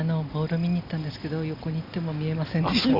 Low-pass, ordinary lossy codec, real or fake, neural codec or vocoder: 5.4 kHz; Opus, 64 kbps; real; none